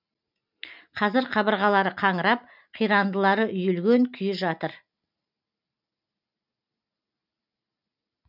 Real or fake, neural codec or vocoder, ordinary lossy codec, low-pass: real; none; none; 5.4 kHz